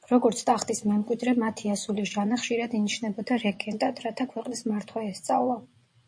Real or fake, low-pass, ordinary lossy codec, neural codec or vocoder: fake; 9.9 kHz; MP3, 48 kbps; vocoder, 24 kHz, 100 mel bands, Vocos